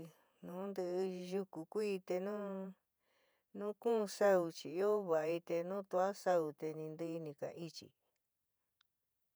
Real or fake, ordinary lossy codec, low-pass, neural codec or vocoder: fake; none; none; vocoder, 48 kHz, 128 mel bands, Vocos